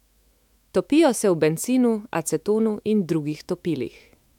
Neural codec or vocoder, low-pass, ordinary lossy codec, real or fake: autoencoder, 48 kHz, 128 numbers a frame, DAC-VAE, trained on Japanese speech; 19.8 kHz; MP3, 96 kbps; fake